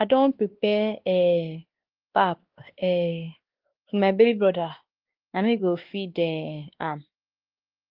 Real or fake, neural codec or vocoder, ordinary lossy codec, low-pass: fake; codec, 16 kHz, 2 kbps, X-Codec, WavLM features, trained on Multilingual LibriSpeech; Opus, 16 kbps; 5.4 kHz